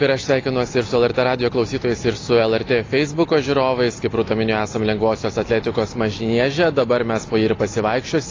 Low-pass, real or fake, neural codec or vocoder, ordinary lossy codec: 7.2 kHz; real; none; AAC, 32 kbps